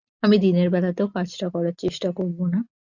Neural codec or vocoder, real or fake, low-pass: none; real; 7.2 kHz